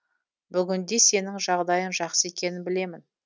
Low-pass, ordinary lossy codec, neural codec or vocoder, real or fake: none; none; none; real